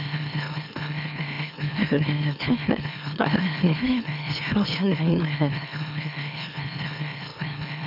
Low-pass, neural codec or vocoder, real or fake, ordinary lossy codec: 5.4 kHz; autoencoder, 44.1 kHz, a latent of 192 numbers a frame, MeloTTS; fake; none